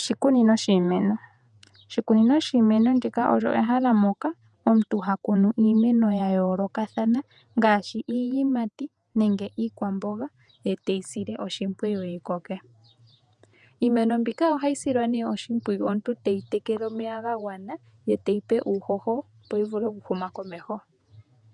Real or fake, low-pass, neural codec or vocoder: fake; 10.8 kHz; vocoder, 48 kHz, 128 mel bands, Vocos